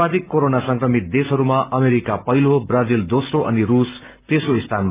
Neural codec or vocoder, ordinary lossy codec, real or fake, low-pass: none; Opus, 24 kbps; real; 3.6 kHz